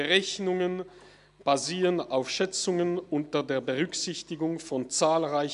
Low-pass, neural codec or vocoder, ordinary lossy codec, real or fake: 10.8 kHz; none; none; real